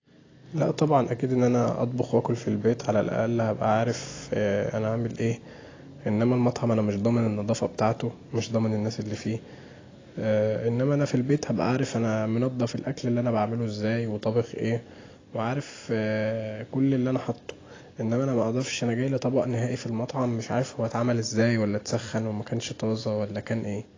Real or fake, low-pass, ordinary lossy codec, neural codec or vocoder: real; 7.2 kHz; AAC, 32 kbps; none